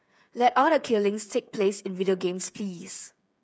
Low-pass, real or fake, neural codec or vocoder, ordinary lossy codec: none; fake; codec, 16 kHz, 8 kbps, FreqCodec, smaller model; none